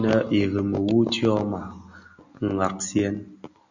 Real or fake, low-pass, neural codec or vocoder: real; 7.2 kHz; none